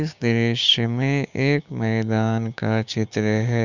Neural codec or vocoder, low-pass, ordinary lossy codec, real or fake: codec, 16 kHz, 6 kbps, DAC; 7.2 kHz; none; fake